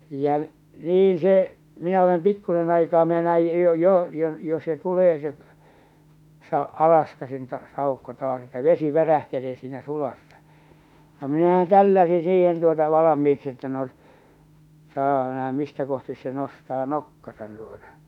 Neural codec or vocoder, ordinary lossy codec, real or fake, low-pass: autoencoder, 48 kHz, 32 numbers a frame, DAC-VAE, trained on Japanese speech; none; fake; 19.8 kHz